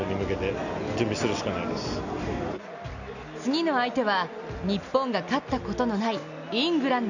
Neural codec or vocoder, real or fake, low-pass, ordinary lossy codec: none; real; 7.2 kHz; none